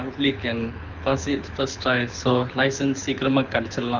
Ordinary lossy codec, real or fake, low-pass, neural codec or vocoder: none; fake; 7.2 kHz; codec, 24 kHz, 6 kbps, HILCodec